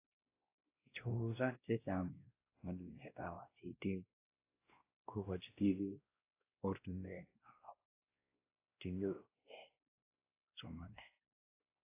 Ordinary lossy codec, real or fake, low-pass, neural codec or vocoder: AAC, 24 kbps; fake; 3.6 kHz; codec, 16 kHz, 1 kbps, X-Codec, WavLM features, trained on Multilingual LibriSpeech